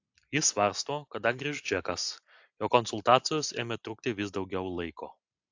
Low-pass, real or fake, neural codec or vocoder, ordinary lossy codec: 7.2 kHz; real; none; AAC, 48 kbps